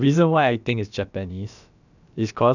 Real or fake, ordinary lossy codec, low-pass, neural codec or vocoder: fake; none; 7.2 kHz; codec, 16 kHz, about 1 kbps, DyCAST, with the encoder's durations